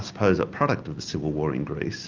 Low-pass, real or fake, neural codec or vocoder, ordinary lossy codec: 7.2 kHz; real; none; Opus, 24 kbps